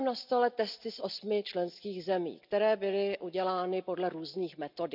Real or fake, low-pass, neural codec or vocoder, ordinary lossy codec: real; 5.4 kHz; none; none